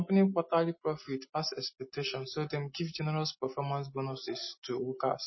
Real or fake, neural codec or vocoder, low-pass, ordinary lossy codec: real; none; 7.2 kHz; MP3, 24 kbps